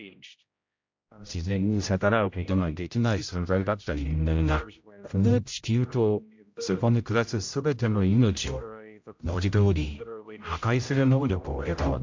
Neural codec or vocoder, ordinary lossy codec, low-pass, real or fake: codec, 16 kHz, 0.5 kbps, X-Codec, HuBERT features, trained on general audio; MP3, 64 kbps; 7.2 kHz; fake